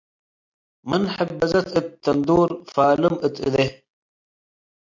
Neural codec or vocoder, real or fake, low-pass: none; real; 7.2 kHz